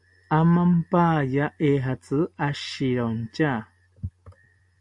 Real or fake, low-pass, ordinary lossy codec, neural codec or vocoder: real; 10.8 kHz; AAC, 64 kbps; none